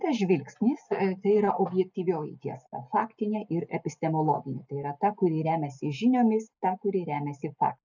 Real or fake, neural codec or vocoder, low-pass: real; none; 7.2 kHz